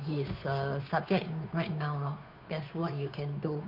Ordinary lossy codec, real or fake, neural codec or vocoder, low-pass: none; fake; codec, 16 kHz, 2 kbps, FunCodec, trained on Chinese and English, 25 frames a second; 5.4 kHz